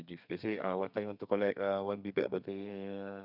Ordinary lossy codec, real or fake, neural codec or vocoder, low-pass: none; fake; codec, 32 kHz, 1.9 kbps, SNAC; 5.4 kHz